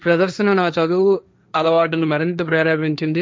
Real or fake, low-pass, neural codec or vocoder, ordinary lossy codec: fake; none; codec, 16 kHz, 1.1 kbps, Voila-Tokenizer; none